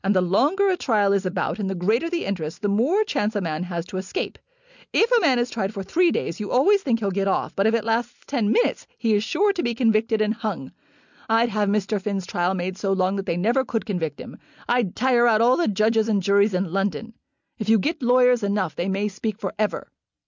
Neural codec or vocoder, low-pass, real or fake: none; 7.2 kHz; real